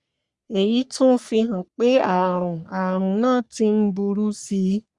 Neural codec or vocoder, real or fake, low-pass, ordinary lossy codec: codec, 44.1 kHz, 3.4 kbps, Pupu-Codec; fake; 10.8 kHz; Opus, 64 kbps